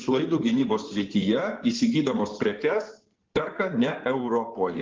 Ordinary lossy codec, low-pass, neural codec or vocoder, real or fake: Opus, 16 kbps; 7.2 kHz; codec, 16 kHz in and 24 kHz out, 2.2 kbps, FireRedTTS-2 codec; fake